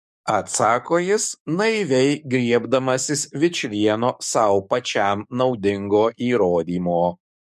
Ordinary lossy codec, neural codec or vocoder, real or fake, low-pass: MP3, 64 kbps; codec, 44.1 kHz, 7.8 kbps, DAC; fake; 14.4 kHz